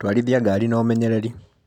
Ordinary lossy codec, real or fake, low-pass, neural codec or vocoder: none; real; 19.8 kHz; none